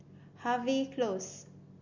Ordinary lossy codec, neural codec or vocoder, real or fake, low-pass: Opus, 64 kbps; none; real; 7.2 kHz